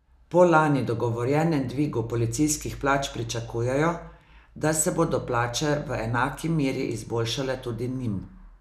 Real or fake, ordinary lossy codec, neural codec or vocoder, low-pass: real; none; none; 14.4 kHz